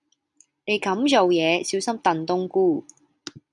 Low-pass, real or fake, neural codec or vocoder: 10.8 kHz; real; none